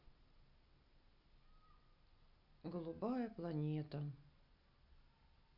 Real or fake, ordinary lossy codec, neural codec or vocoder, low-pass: real; none; none; 5.4 kHz